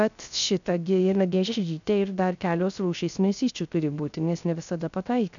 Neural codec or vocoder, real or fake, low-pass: codec, 16 kHz, 0.3 kbps, FocalCodec; fake; 7.2 kHz